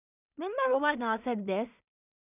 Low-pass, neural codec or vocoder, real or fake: 3.6 kHz; codec, 16 kHz in and 24 kHz out, 0.4 kbps, LongCat-Audio-Codec, two codebook decoder; fake